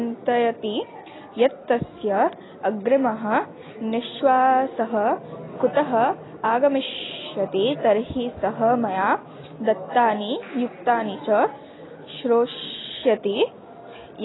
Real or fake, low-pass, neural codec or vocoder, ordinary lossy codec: real; 7.2 kHz; none; AAC, 16 kbps